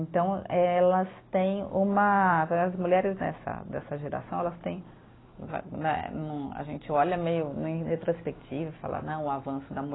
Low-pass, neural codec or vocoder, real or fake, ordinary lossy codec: 7.2 kHz; none; real; AAC, 16 kbps